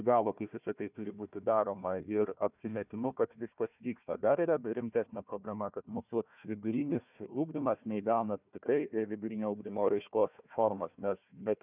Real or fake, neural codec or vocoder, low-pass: fake; codec, 16 kHz, 1 kbps, FunCodec, trained on Chinese and English, 50 frames a second; 3.6 kHz